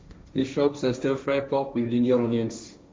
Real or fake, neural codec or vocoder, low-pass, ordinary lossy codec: fake; codec, 16 kHz, 1.1 kbps, Voila-Tokenizer; none; none